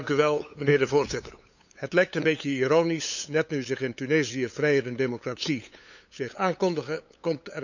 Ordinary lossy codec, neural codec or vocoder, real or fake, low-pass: none; codec, 16 kHz, 8 kbps, FunCodec, trained on LibriTTS, 25 frames a second; fake; 7.2 kHz